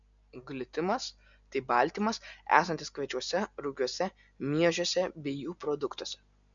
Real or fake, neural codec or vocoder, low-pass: real; none; 7.2 kHz